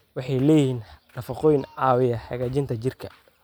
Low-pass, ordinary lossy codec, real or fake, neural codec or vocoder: none; none; real; none